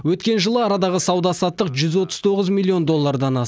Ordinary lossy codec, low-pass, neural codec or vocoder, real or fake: none; none; none; real